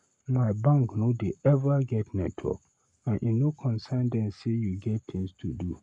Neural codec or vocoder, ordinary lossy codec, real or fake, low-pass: codec, 44.1 kHz, 7.8 kbps, Pupu-Codec; none; fake; 10.8 kHz